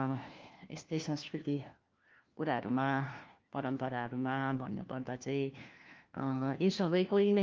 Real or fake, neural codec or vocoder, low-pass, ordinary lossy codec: fake; codec, 16 kHz, 1 kbps, FunCodec, trained on LibriTTS, 50 frames a second; 7.2 kHz; Opus, 32 kbps